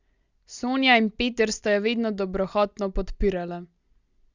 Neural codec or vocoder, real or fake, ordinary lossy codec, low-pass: none; real; Opus, 64 kbps; 7.2 kHz